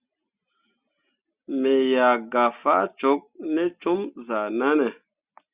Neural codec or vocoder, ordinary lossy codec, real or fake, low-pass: none; Opus, 64 kbps; real; 3.6 kHz